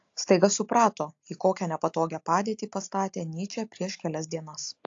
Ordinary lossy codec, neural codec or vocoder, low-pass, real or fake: AAC, 48 kbps; none; 7.2 kHz; real